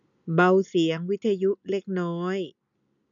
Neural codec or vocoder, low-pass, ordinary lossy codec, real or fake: none; 7.2 kHz; none; real